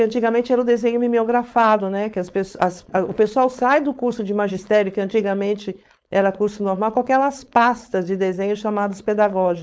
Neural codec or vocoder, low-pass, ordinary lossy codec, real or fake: codec, 16 kHz, 4.8 kbps, FACodec; none; none; fake